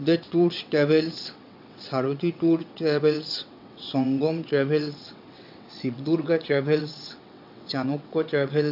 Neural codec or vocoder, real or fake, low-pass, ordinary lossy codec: vocoder, 22.05 kHz, 80 mel bands, WaveNeXt; fake; 5.4 kHz; MP3, 48 kbps